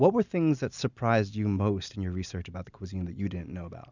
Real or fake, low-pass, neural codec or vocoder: real; 7.2 kHz; none